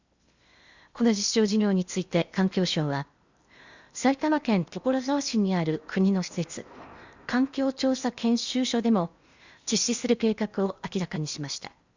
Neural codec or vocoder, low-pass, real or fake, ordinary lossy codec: codec, 16 kHz in and 24 kHz out, 0.8 kbps, FocalCodec, streaming, 65536 codes; 7.2 kHz; fake; Opus, 64 kbps